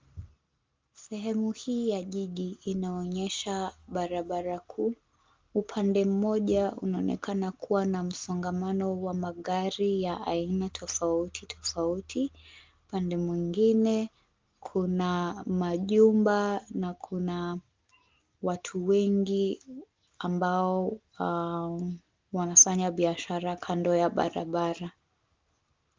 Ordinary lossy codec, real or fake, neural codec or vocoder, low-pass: Opus, 32 kbps; real; none; 7.2 kHz